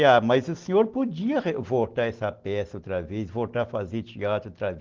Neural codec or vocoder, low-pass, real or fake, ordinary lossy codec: none; 7.2 kHz; real; Opus, 16 kbps